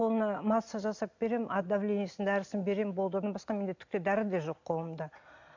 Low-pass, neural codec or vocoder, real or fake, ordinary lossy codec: 7.2 kHz; none; real; MP3, 48 kbps